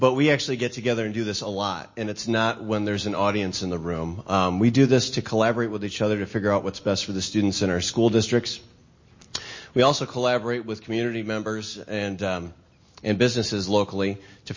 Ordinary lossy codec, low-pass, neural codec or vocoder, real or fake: MP3, 32 kbps; 7.2 kHz; none; real